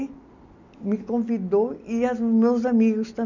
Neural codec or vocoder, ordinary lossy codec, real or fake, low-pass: none; none; real; 7.2 kHz